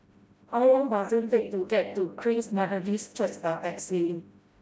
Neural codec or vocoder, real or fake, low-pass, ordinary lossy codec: codec, 16 kHz, 0.5 kbps, FreqCodec, smaller model; fake; none; none